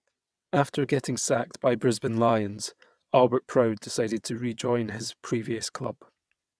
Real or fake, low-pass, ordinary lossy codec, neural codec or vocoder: fake; none; none; vocoder, 22.05 kHz, 80 mel bands, WaveNeXt